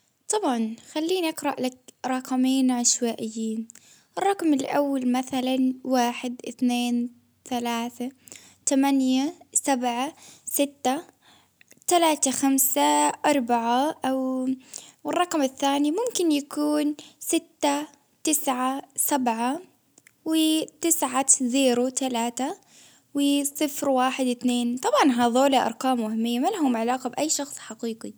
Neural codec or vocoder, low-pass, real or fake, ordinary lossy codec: none; none; real; none